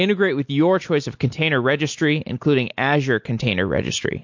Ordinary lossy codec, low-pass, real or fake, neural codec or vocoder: MP3, 48 kbps; 7.2 kHz; real; none